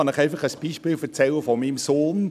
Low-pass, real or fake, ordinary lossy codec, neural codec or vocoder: 14.4 kHz; real; none; none